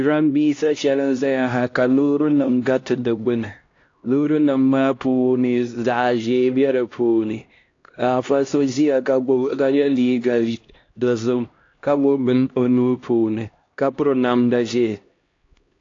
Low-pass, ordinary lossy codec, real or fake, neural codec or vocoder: 7.2 kHz; AAC, 48 kbps; fake; codec, 16 kHz, 1 kbps, X-Codec, HuBERT features, trained on LibriSpeech